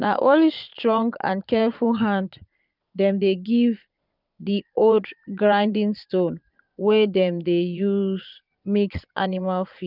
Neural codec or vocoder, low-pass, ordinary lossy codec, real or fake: vocoder, 22.05 kHz, 80 mel bands, Vocos; 5.4 kHz; none; fake